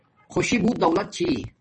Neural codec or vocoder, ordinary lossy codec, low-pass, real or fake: none; MP3, 32 kbps; 10.8 kHz; real